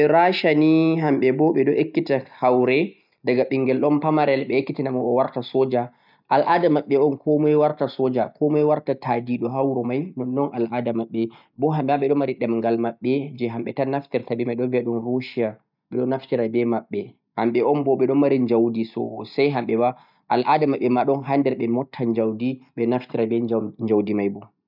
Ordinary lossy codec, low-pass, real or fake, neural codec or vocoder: none; 5.4 kHz; real; none